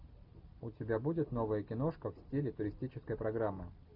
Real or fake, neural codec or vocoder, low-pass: real; none; 5.4 kHz